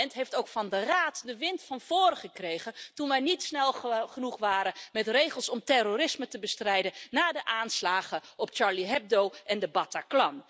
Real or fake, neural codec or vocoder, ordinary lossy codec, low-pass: real; none; none; none